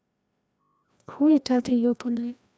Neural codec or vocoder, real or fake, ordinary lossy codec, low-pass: codec, 16 kHz, 1 kbps, FreqCodec, larger model; fake; none; none